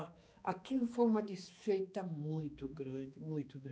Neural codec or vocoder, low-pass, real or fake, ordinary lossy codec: codec, 16 kHz, 2 kbps, X-Codec, HuBERT features, trained on balanced general audio; none; fake; none